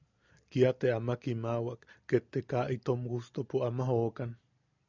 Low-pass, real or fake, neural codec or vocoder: 7.2 kHz; real; none